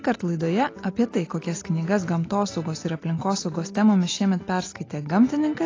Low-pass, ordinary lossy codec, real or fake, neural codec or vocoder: 7.2 kHz; AAC, 32 kbps; real; none